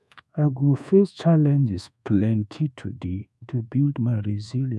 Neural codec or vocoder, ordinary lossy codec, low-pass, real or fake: codec, 24 kHz, 1.2 kbps, DualCodec; none; none; fake